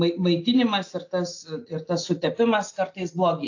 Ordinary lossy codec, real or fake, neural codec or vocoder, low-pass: AAC, 48 kbps; real; none; 7.2 kHz